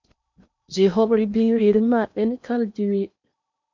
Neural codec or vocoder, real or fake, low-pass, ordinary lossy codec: codec, 16 kHz in and 24 kHz out, 0.8 kbps, FocalCodec, streaming, 65536 codes; fake; 7.2 kHz; MP3, 64 kbps